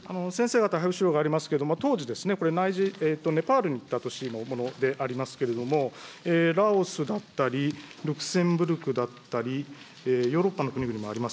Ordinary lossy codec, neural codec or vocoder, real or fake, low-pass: none; none; real; none